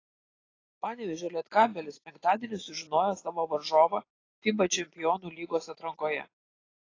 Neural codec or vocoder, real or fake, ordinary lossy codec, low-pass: none; real; AAC, 32 kbps; 7.2 kHz